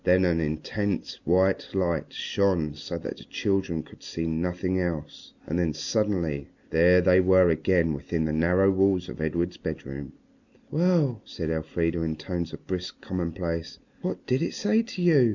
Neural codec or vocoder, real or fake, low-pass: none; real; 7.2 kHz